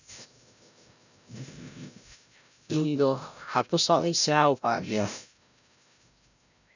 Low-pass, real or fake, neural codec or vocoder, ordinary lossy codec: 7.2 kHz; fake; codec, 16 kHz, 0.5 kbps, FreqCodec, larger model; none